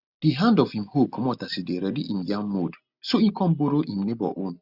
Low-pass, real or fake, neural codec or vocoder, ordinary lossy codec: 5.4 kHz; real; none; Opus, 64 kbps